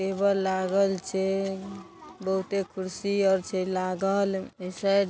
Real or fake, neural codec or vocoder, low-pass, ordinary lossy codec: real; none; none; none